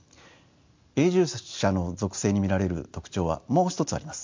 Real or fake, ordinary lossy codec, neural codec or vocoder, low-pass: real; MP3, 64 kbps; none; 7.2 kHz